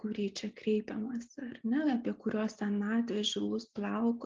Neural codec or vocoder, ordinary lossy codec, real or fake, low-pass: none; Opus, 32 kbps; real; 7.2 kHz